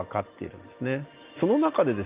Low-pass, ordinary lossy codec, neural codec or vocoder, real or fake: 3.6 kHz; Opus, 32 kbps; none; real